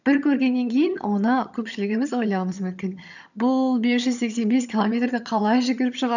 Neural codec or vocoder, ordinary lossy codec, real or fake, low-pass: vocoder, 22.05 kHz, 80 mel bands, HiFi-GAN; none; fake; 7.2 kHz